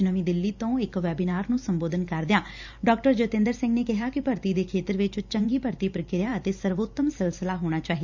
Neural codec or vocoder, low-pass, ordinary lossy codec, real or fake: vocoder, 44.1 kHz, 128 mel bands every 512 samples, BigVGAN v2; 7.2 kHz; none; fake